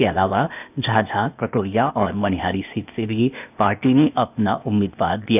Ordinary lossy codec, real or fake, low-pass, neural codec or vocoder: none; fake; 3.6 kHz; codec, 16 kHz, 0.8 kbps, ZipCodec